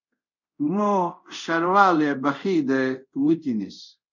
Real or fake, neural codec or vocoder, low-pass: fake; codec, 24 kHz, 0.5 kbps, DualCodec; 7.2 kHz